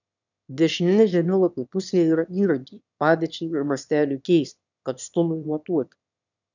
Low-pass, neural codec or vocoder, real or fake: 7.2 kHz; autoencoder, 22.05 kHz, a latent of 192 numbers a frame, VITS, trained on one speaker; fake